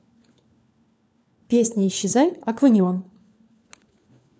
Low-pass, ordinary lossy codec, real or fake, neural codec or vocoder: none; none; fake; codec, 16 kHz, 4 kbps, FunCodec, trained on LibriTTS, 50 frames a second